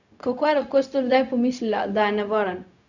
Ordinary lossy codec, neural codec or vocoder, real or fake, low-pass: none; codec, 16 kHz, 0.4 kbps, LongCat-Audio-Codec; fake; 7.2 kHz